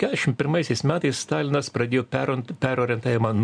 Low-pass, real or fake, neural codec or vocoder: 9.9 kHz; real; none